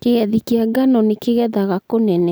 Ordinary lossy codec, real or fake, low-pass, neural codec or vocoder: none; real; none; none